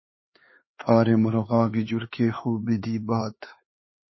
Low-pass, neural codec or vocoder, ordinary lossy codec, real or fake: 7.2 kHz; codec, 16 kHz, 4 kbps, X-Codec, HuBERT features, trained on LibriSpeech; MP3, 24 kbps; fake